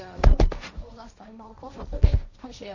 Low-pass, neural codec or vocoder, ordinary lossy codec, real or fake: 7.2 kHz; codec, 16 kHz, 1.1 kbps, Voila-Tokenizer; none; fake